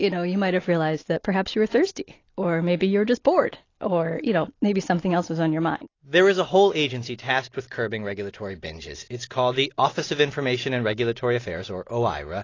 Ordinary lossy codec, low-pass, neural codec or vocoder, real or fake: AAC, 32 kbps; 7.2 kHz; none; real